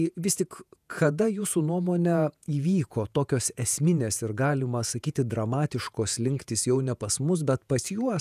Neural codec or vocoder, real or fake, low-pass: vocoder, 48 kHz, 128 mel bands, Vocos; fake; 14.4 kHz